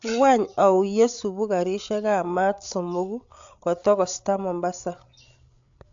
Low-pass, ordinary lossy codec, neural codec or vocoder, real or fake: 7.2 kHz; AAC, 64 kbps; codec, 16 kHz, 8 kbps, FreqCodec, larger model; fake